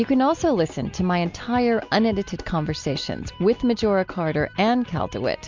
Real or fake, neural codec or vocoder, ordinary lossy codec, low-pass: real; none; MP3, 64 kbps; 7.2 kHz